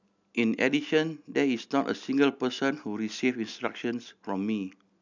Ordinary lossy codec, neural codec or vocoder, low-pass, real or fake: none; none; 7.2 kHz; real